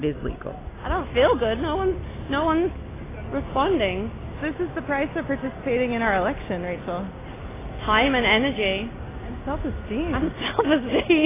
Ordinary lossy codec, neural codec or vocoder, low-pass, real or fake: AAC, 16 kbps; none; 3.6 kHz; real